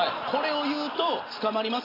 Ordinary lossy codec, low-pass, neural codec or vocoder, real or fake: AAC, 24 kbps; 5.4 kHz; none; real